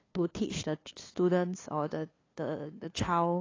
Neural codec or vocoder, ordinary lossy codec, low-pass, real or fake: codec, 16 kHz, 2 kbps, FunCodec, trained on LibriTTS, 25 frames a second; AAC, 32 kbps; 7.2 kHz; fake